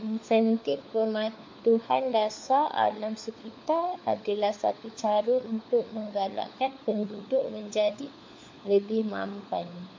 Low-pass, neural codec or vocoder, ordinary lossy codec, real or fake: 7.2 kHz; codec, 16 kHz, 4 kbps, FunCodec, trained on LibriTTS, 50 frames a second; MP3, 48 kbps; fake